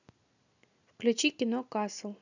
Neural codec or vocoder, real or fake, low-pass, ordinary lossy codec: none; real; 7.2 kHz; none